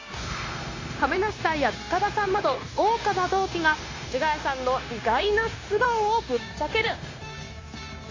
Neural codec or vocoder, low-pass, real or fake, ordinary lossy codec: codec, 16 kHz, 0.9 kbps, LongCat-Audio-Codec; 7.2 kHz; fake; AAC, 32 kbps